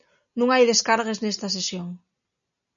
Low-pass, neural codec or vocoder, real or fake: 7.2 kHz; none; real